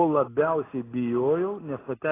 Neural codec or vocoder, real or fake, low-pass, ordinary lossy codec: none; real; 3.6 kHz; AAC, 16 kbps